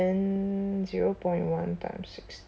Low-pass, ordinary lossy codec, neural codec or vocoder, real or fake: none; none; none; real